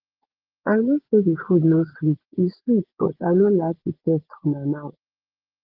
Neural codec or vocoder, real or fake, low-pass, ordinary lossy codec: vocoder, 24 kHz, 100 mel bands, Vocos; fake; 5.4 kHz; Opus, 16 kbps